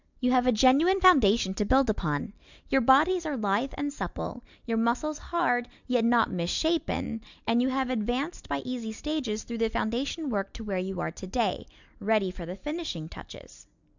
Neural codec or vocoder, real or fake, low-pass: none; real; 7.2 kHz